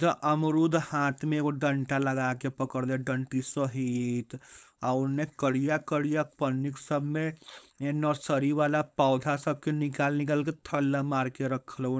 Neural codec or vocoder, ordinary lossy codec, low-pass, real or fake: codec, 16 kHz, 4.8 kbps, FACodec; none; none; fake